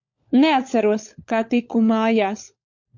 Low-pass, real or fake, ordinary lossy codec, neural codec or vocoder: 7.2 kHz; fake; MP3, 48 kbps; codec, 16 kHz, 16 kbps, FunCodec, trained on LibriTTS, 50 frames a second